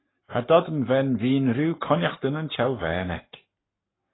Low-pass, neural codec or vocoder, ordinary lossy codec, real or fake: 7.2 kHz; codec, 44.1 kHz, 7.8 kbps, Pupu-Codec; AAC, 16 kbps; fake